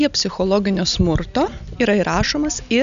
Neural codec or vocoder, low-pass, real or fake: none; 7.2 kHz; real